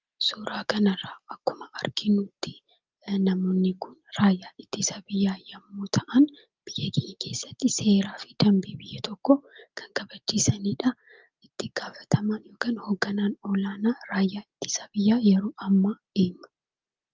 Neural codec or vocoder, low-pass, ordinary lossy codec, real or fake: none; 7.2 kHz; Opus, 32 kbps; real